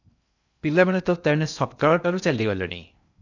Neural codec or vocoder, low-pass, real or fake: codec, 16 kHz in and 24 kHz out, 0.6 kbps, FocalCodec, streaming, 2048 codes; 7.2 kHz; fake